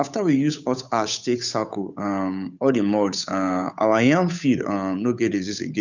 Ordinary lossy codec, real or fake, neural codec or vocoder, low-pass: none; fake; codec, 16 kHz, 8 kbps, FunCodec, trained on Chinese and English, 25 frames a second; 7.2 kHz